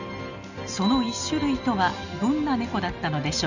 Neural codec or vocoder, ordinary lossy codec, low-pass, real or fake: none; none; 7.2 kHz; real